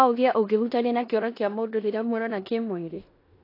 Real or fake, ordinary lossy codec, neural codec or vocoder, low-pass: fake; AAC, 32 kbps; codec, 16 kHz in and 24 kHz out, 0.9 kbps, LongCat-Audio-Codec, four codebook decoder; 5.4 kHz